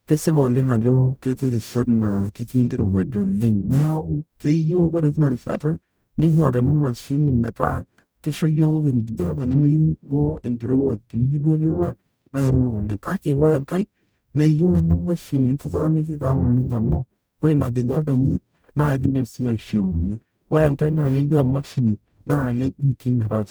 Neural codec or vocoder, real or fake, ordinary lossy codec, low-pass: codec, 44.1 kHz, 0.9 kbps, DAC; fake; none; none